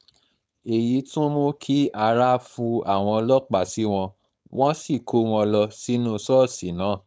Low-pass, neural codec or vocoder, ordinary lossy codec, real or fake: none; codec, 16 kHz, 4.8 kbps, FACodec; none; fake